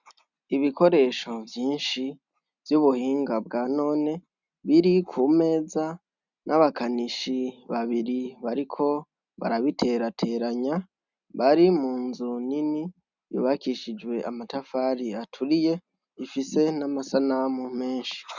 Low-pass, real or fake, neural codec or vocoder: 7.2 kHz; real; none